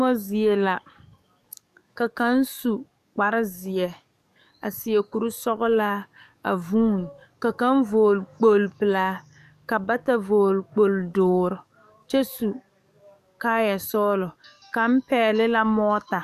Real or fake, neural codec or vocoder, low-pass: fake; codec, 44.1 kHz, 7.8 kbps, DAC; 14.4 kHz